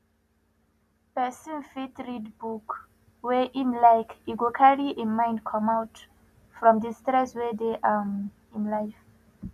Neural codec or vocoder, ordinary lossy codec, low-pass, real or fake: none; none; 14.4 kHz; real